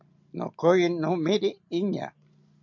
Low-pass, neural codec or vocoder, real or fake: 7.2 kHz; none; real